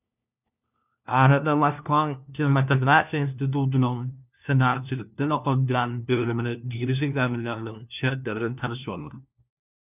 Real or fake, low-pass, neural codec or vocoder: fake; 3.6 kHz; codec, 16 kHz, 1 kbps, FunCodec, trained on LibriTTS, 50 frames a second